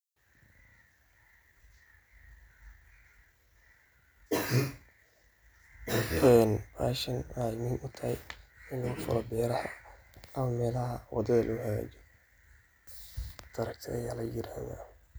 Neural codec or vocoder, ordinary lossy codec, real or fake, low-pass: none; none; real; none